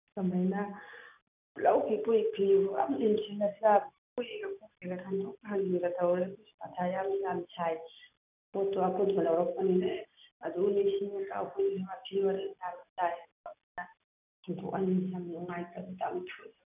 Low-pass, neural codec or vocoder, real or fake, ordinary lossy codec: 3.6 kHz; none; real; none